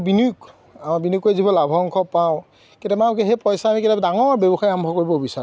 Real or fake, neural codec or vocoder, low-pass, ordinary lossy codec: real; none; none; none